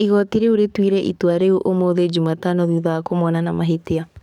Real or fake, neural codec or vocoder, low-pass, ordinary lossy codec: fake; codec, 44.1 kHz, 7.8 kbps, DAC; 19.8 kHz; none